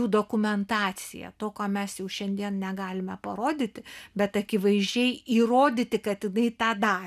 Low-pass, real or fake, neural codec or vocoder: 14.4 kHz; real; none